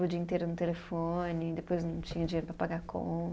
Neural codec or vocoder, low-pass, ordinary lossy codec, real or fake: none; none; none; real